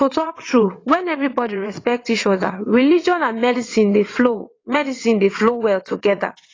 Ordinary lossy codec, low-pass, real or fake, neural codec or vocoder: AAC, 32 kbps; 7.2 kHz; fake; vocoder, 22.05 kHz, 80 mel bands, WaveNeXt